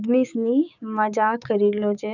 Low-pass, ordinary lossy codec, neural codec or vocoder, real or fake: 7.2 kHz; none; codec, 16 kHz, 6 kbps, DAC; fake